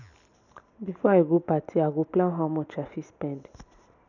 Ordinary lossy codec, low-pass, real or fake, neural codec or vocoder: none; 7.2 kHz; real; none